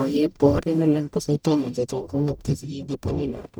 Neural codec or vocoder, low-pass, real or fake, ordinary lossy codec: codec, 44.1 kHz, 0.9 kbps, DAC; none; fake; none